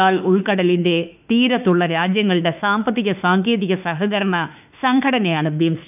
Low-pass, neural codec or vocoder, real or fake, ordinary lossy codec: 3.6 kHz; autoencoder, 48 kHz, 32 numbers a frame, DAC-VAE, trained on Japanese speech; fake; none